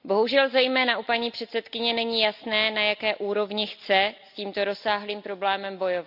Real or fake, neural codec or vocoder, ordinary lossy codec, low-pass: real; none; AAC, 48 kbps; 5.4 kHz